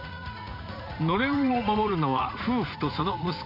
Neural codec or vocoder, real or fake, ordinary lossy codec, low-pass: vocoder, 44.1 kHz, 80 mel bands, Vocos; fake; none; 5.4 kHz